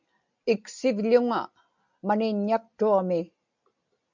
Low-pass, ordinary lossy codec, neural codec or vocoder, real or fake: 7.2 kHz; MP3, 64 kbps; none; real